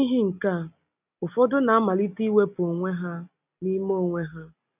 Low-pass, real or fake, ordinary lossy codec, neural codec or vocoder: 3.6 kHz; real; none; none